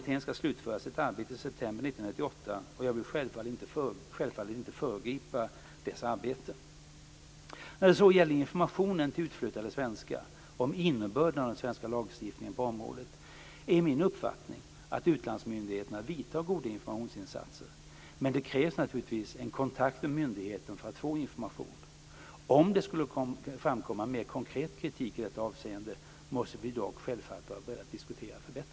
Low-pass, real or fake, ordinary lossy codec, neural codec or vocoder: none; real; none; none